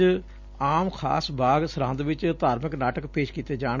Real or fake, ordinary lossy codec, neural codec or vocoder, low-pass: real; none; none; 7.2 kHz